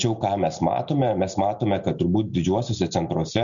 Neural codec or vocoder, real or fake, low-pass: none; real; 7.2 kHz